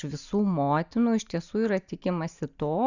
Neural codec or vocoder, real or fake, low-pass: none; real; 7.2 kHz